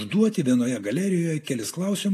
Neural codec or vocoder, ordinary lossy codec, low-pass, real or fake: none; AAC, 64 kbps; 14.4 kHz; real